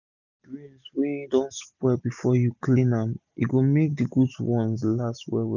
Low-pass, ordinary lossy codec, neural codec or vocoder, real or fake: 7.2 kHz; none; none; real